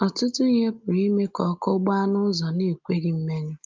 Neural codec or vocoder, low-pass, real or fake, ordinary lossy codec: none; 7.2 kHz; real; Opus, 24 kbps